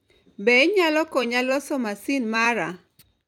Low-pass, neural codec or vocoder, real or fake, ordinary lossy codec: 19.8 kHz; vocoder, 44.1 kHz, 128 mel bands every 256 samples, BigVGAN v2; fake; none